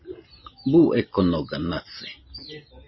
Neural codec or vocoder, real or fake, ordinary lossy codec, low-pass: none; real; MP3, 24 kbps; 7.2 kHz